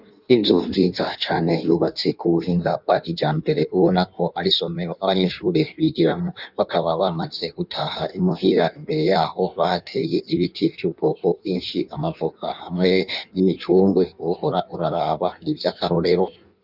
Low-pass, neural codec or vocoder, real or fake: 5.4 kHz; codec, 16 kHz in and 24 kHz out, 1.1 kbps, FireRedTTS-2 codec; fake